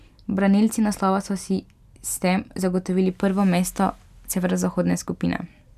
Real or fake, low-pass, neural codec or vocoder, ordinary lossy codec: real; 14.4 kHz; none; none